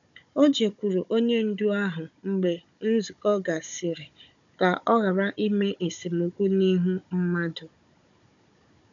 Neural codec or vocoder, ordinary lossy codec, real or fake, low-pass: codec, 16 kHz, 16 kbps, FunCodec, trained on Chinese and English, 50 frames a second; none; fake; 7.2 kHz